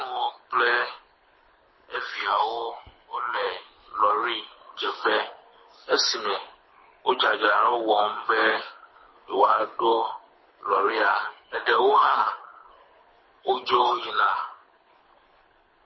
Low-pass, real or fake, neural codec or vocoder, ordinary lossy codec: 7.2 kHz; fake; codec, 24 kHz, 6 kbps, HILCodec; MP3, 24 kbps